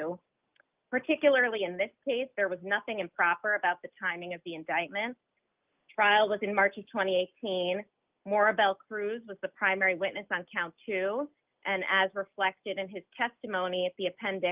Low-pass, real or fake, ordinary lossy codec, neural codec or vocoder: 3.6 kHz; real; Opus, 24 kbps; none